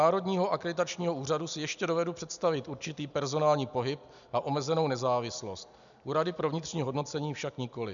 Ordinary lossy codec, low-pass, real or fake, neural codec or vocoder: MP3, 96 kbps; 7.2 kHz; real; none